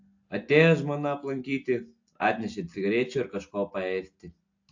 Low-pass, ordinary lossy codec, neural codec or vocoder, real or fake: 7.2 kHz; AAC, 48 kbps; none; real